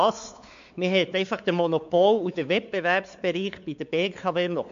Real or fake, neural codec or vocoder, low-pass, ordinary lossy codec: fake; codec, 16 kHz, 4 kbps, X-Codec, WavLM features, trained on Multilingual LibriSpeech; 7.2 kHz; MP3, 64 kbps